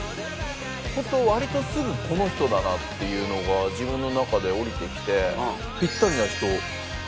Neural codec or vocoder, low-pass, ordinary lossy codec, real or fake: none; none; none; real